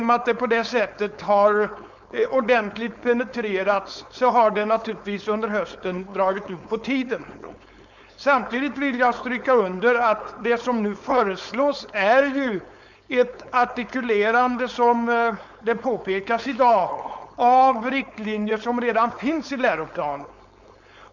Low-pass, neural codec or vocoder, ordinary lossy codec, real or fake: 7.2 kHz; codec, 16 kHz, 4.8 kbps, FACodec; none; fake